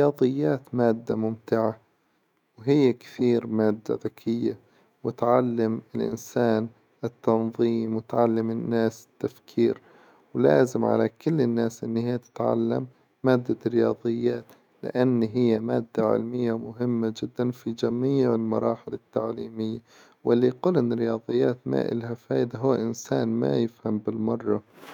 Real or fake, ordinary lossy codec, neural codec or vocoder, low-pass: fake; none; autoencoder, 48 kHz, 128 numbers a frame, DAC-VAE, trained on Japanese speech; 19.8 kHz